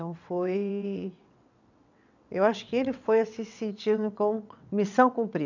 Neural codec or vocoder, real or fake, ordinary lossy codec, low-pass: vocoder, 22.05 kHz, 80 mel bands, WaveNeXt; fake; none; 7.2 kHz